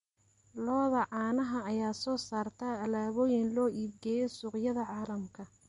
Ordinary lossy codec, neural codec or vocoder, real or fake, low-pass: MP3, 48 kbps; none; real; 19.8 kHz